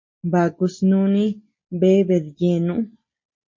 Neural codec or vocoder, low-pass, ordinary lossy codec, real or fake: none; 7.2 kHz; MP3, 32 kbps; real